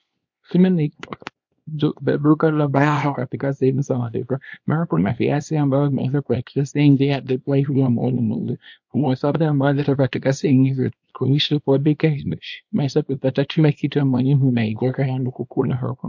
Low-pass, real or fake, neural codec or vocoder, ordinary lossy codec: 7.2 kHz; fake; codec, 24 kHz, 0.9 kbps, WavTokenizer, small release; MP3, 48 kbps